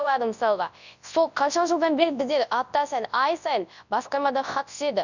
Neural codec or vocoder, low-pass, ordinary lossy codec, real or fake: codec, 24 kHz, 0.9 kbps, WavTokenizer, large speech release; 7.2 kHz; none; fake